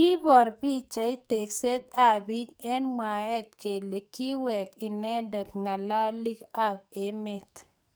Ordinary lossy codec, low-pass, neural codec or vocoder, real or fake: none; none; codec, 44.1 kHz, 2.6 kbps, SNAC; fake